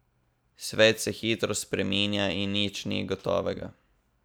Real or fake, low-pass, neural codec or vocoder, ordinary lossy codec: real; none; none; none